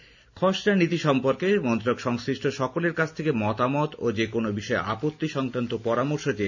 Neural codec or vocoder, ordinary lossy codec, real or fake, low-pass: none; none; real; 7.2 kHz